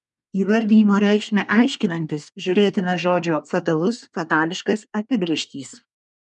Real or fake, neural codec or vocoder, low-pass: fake; codec, 24 kHz, 1 kbps, SNAC; 10.8 kHz